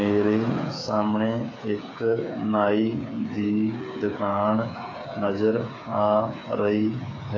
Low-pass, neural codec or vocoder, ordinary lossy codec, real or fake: 7.2 kHz; codec, 16 kHz, 8 kbps, FreqCodec, smaller model; AAC, 32 kbps; fake